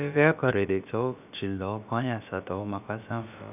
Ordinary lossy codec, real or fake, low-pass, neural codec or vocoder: none; fake; 3.6 kHz; codec, 16 kHz, about 1 kbps, DyCAST, with the encoder's durations